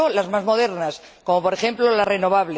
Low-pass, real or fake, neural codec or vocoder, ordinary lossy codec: none; real; none; none